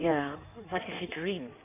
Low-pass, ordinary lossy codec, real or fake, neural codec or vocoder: 3.6 kHz; none; fake; codec, 16 kHz in and 24 kHz out, 1.1 kbps, FireRedTTS-2 codec